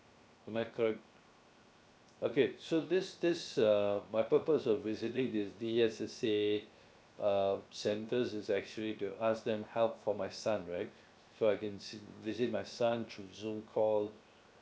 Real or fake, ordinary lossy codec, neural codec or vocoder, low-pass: fake; none; codec, 16 kHz, 0.7 kbps, FocalCodec; none